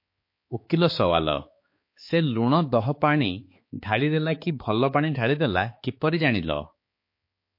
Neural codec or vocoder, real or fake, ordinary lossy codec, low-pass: codec, 16 kHz, 4 kbps, X-Codec, HuBERT features, trained on balanced general audio; fake; MP3, 32 kbps; 5.4 kHz